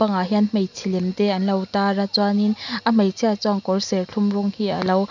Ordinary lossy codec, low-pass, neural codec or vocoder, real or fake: none; 7.2 kHz; none; real